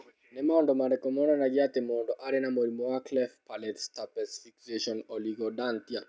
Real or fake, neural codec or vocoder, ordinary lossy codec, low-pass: real; none; none; none